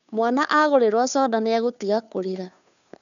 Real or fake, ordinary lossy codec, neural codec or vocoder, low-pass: fake; none; codec, 16 kHz, 2 kbps, FunCodec, trained on Chinese and English, 25 frames a second; 7.2 kHz